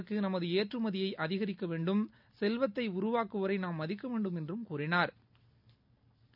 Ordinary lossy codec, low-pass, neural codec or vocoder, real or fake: none; 5.4 kHz; none; real